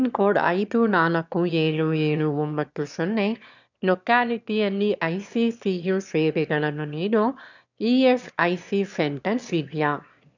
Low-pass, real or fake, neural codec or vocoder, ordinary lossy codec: 7.2 kHz; fake; autoencoder, 22.05 kHz, a latent of 192 numbers a frame, VITS, trained on one speaker; none